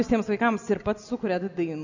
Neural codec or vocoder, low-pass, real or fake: none; 7.2 kHz; real